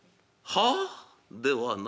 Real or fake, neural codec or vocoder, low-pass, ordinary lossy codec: real; none; none; none